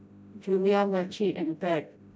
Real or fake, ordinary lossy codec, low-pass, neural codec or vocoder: fake; none; none; codec, 16 kHz, 0.5 kbps, FreqCodec, smaller model